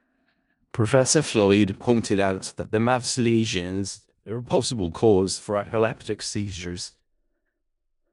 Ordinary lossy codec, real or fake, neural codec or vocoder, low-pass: none; fake; codec, 16 kHz in and 24 kHz out, 0.4 kbps, LongCat-Audio-Codec, four codebook decoder; 10.8 kHz